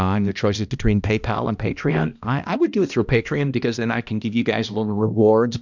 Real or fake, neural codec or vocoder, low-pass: fake; codec, 16 kHz, 1 kbps, X-Codec, HuBERT features, trained on balanced general audio; 7.2 kHz